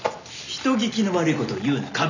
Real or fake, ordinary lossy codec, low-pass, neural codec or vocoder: real; none; 7.2 kHz; none